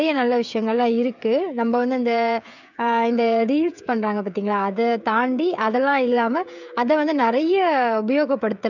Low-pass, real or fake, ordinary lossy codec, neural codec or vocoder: 7.2 kHz; fake; none; codec, 16 kHz, 16 kbps, FreqCodec, smaller model